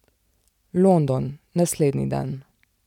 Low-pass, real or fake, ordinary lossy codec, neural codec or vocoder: 19.8 kHz; real; none; none